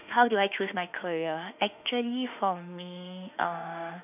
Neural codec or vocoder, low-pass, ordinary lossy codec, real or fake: autoencoder, 48 kHz, 32 numbers a frame, DAC-VAE, trained on Japanese speech; 3.6 kHz; none; fake